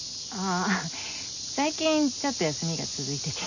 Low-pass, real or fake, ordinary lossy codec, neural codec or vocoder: 7.2 kHz; real; none; none